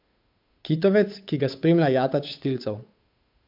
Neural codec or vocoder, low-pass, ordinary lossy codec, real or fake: codec, 16 kHz, 8 kbps, FunCodec, trained on Chinese and English, 25 frames a second; 5.4 kHz; MP3, 48 kbps; fake